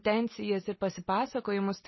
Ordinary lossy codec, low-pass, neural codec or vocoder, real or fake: MP3, 24 kbps; 7.2 kHz; none; real